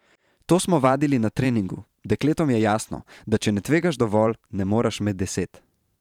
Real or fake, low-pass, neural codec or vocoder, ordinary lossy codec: fake; 19.8 kHz; vocoder, 44.1 kHz, 128 mel bands every 512 samples, BigVGAN v2; none